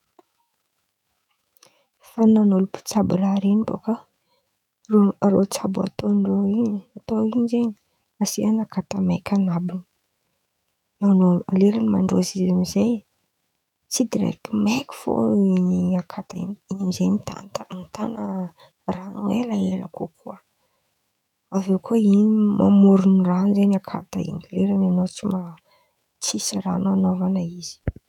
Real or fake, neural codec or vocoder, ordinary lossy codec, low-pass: fake; autoencoder, 48 kHz, 128 numbers a frame, DAC-VAE, trained on Japanese speech; none; 19.8 kHz